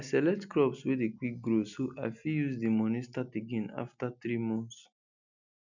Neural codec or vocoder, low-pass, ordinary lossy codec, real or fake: none; 7.2 kHz; none; real